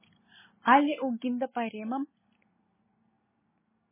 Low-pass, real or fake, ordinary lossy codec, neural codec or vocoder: 3.6 kHz; real; MP3, 16 kbps; none